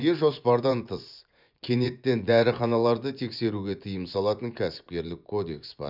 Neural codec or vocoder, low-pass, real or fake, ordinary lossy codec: vocoder, 24 kHz, 100 mel bands, Vocos; 5.4 kHz; fake; none